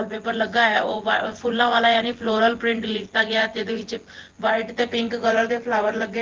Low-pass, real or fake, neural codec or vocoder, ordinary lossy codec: 7.2 kHz; fake; vocoder, 24 kHz, 100 mel bands, Vocos; Opus, 16 kbps